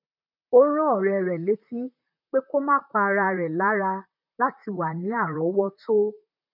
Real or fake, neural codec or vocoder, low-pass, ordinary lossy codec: fake; vocoder, 44.1 kHz, 128 mel bands, Pupu-Vocoder; 5.4 kHz; none